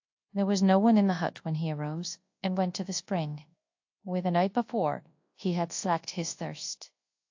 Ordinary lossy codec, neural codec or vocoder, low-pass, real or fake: AAC, 48 kbps; codec, 24 kHz, 0.9 kbps, WavTokenizer, large speech release; 7.2 kHz; fake